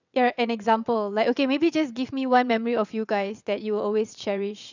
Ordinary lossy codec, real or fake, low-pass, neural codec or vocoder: none; fake; 7.2 kHz; vocoder, 22.05 kHz, 80 mel bands, WaveNeXt